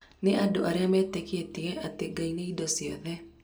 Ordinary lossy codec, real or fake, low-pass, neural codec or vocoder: none; real; none; none